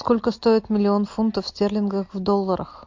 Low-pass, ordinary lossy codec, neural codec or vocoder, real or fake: 7.2 kHz; MP3, 48 kbps; none; real